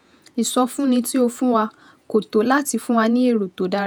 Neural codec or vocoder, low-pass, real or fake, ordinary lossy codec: vocoder, 48 kHz, 128 mel bands, Vocos; none; fake; none